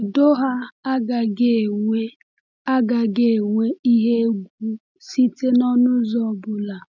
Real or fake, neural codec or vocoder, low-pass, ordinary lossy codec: real; none; 7.2 kHz; none